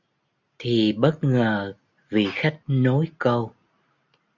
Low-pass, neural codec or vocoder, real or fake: 7.2 kHz; none; real